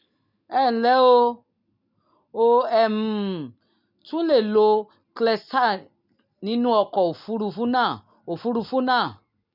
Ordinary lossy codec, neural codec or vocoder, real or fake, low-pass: none; none; real; 5.4 kHz